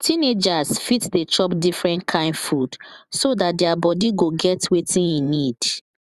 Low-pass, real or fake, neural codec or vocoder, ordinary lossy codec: 14.4 kHz; fake; vocoder, 44.1 kHz, 128 mel bands every 512 samples, BigVGAN v2; Opus, 64 kbps